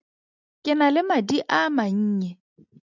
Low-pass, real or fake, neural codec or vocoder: 7.2 kHz; real; none